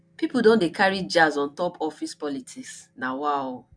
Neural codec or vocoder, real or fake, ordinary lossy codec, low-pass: none; real; none; 9.9 kHz